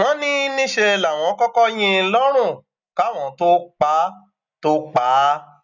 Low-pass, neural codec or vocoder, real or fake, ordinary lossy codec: 7.2 kHz; none; real; none